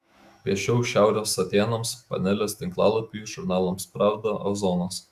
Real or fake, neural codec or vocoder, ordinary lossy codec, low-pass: fake; autoencoder, 48 kHz, 128 numbers a frame, DAC-VAE, trained on Japanese speech; Opus, 64 kbps; 14.4 kHz